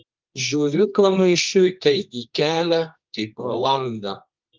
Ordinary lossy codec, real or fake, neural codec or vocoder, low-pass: Opus, 32 kbps; fake; codec, 24 kHz, 0.9 kbps, WavTokenizer, medium music audio release; 7.2 kHz